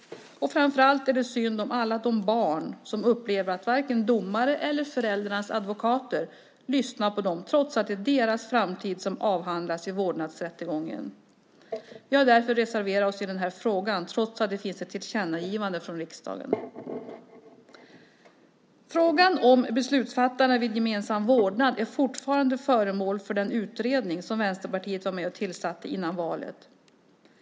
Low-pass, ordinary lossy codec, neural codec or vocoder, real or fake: none; none; none; real